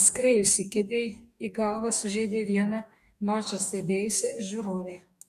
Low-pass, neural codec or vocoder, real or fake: 14.4 kHz; codec, 44.1 kHz, 2.6 kbps, DAC; fake